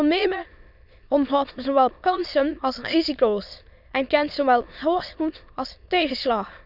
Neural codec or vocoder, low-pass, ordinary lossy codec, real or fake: autoencoder, 22.05 kHz, a latent of 192 numbers a frame, VITS, trained on many speakers; 5.4 kHz; none; fake